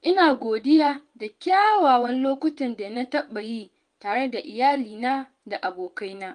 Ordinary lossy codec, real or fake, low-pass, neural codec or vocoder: Opus, 32 kbps; fake; 9.9 kHz; vocoder, 22.05 kHz, 80 mel bands, WaveNeXt